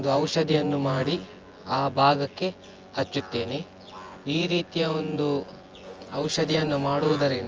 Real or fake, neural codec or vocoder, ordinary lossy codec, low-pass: fake; vocoder, 24 kHz, 100 mel bands, Vocos; Opus, 24 kbps; 7.2 kHz